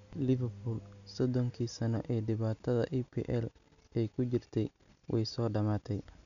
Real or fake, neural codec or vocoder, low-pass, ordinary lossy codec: real; none; 7.2 kHz; none